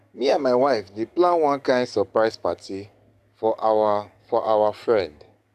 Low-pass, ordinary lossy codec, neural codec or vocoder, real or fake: 14.4 kHz; none; codec, 44.1 kHz, 7.8 kbps, DAC; fake